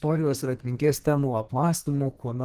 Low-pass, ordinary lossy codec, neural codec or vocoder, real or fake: 14.4 kHz; Opus, 16 kbps; codec, 32 kHz, 1.9 kbps, SNAC; fake